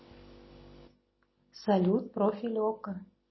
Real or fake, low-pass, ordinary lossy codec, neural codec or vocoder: real; 7.2 kHz; MP3, 24 kbps; none